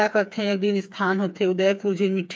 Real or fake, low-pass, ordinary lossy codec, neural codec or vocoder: fake; none; none; codec, 16 kHz, 4 kbps, FreqCodec, smaller model